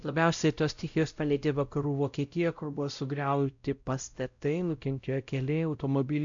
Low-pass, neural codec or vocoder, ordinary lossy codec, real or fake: 7.2 kHz; codec, 16 kHz, 0.5 kbps, X-Codec, WavLM features, trained on Multilingual LibriSpeech; AAC, 64 kbps; fake